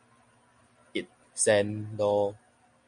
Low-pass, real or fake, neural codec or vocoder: 9.9 kHz; real; none